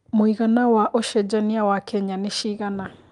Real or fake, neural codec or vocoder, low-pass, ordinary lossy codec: real; none; 10.8 kHz; Opus, 32 kbps